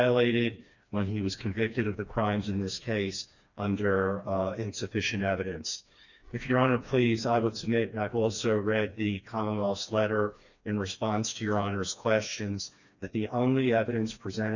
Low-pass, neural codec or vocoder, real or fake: 7.2 kHz; codec, 16 kHz, 2 kbps, FreqCodec, smaller model; fake